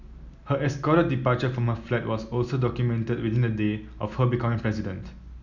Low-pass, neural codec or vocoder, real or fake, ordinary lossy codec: 7.2 kHz; none; real; none